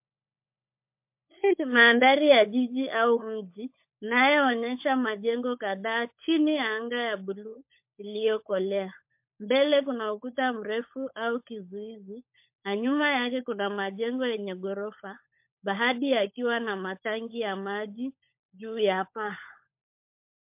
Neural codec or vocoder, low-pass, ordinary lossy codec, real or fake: codec, 16 kHz, 16 kbps, FunCodec, trained on LibriTTS, 50 frames a second; 3.6 kHz; MP3, 32 kbps; fake